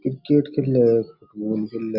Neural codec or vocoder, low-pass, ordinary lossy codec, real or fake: none; 5.4 kHz; none; real